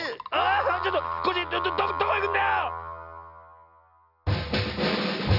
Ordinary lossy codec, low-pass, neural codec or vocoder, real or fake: none; 5.4 kHz; none; real